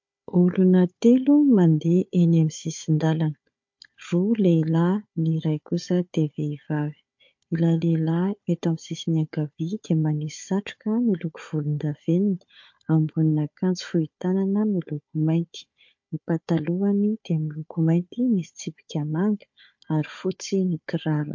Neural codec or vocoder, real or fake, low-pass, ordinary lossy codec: codec, 16 kHz, 4 kbps, FunCodec, trained on Chinese and English, 50 frames a second; fake; 7.2 kHz; MP3, 48 kbps